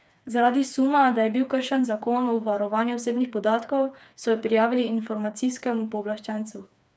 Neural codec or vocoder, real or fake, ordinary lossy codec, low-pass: codec, 16 kHz, 4 kbps, FreqCodec, smaller model; fake; none; none